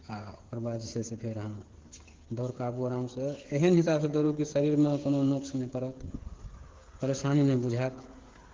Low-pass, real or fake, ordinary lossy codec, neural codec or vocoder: 7.2 kHz; fake; Opus, 16 kbps; codec, 16 kHz, 8 kbps, FreqCodec, smaller model